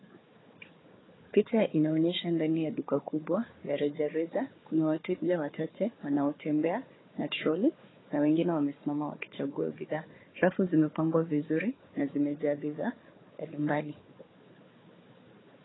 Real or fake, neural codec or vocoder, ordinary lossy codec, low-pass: fake; codec, 16 kHz, 4 kbps, FunCodec, trained on Chinese and English, 50 frames a second; AAC, 16 kbps; 7.2 kHz